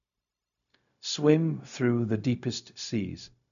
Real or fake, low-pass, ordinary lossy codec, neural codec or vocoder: fake; 7.2 kHz; none; codec, 16 kHz, 0.4 kbps, LongCat-Audio-Codec